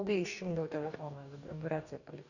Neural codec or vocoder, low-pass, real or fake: codec, 44.1 kHz, 2.6 kbps, DAC; 7.2 kHz; fake